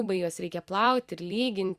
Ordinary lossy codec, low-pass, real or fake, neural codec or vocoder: Opus, 64 kbps; 14.4 kHz; fake; vocoder, 44.1 kHz, 128 mel bands every 256 samples, BigVGAN v2